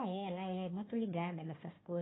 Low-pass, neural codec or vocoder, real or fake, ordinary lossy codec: 7.2 kHz; codec, 16 kHz, 1 kbps, FunCodec, trained on Chinese and English, 50 frames a second; fake; AAC, 16 kbps